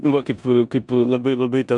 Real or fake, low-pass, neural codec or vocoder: fake; 10.8 kHz; codec, 16 kHz in and 24 kHz out, 0.9 kbps, LongCat-Audio-Codec, four codebook decoder